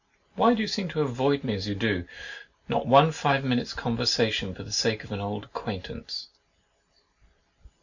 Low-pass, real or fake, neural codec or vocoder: 7.2 kHz; real; none